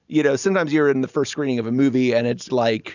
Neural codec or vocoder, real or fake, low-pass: none; real; 7.2 kHz